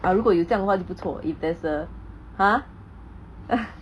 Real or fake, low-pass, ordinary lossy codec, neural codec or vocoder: real; none; none; none